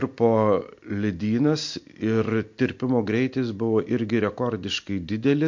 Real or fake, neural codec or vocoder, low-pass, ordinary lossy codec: real; none; 7.2 kHz; MP3, 48 kbps